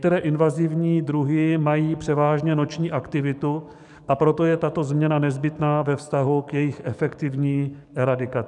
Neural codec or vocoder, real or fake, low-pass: codec, 44.1 kHz, 7.8 kbps, DAC; fake; 10.8 kHz